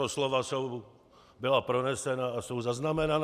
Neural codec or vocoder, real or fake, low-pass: none; real; 14.4 kHz